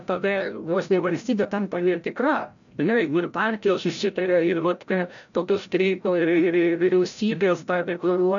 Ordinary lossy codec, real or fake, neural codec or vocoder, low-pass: MP3, 96 kbps; fake; codec, 16 kHz, 0.5 kbps, FreqCodec, larger model; 7.2 kHz